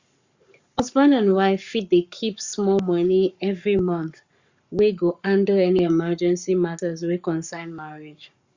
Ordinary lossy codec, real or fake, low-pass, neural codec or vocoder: Opus, 64 kbps; fake; 7.2 kHz; codec, 44.1 kHz, 7.8 kbps, Pupu-Codec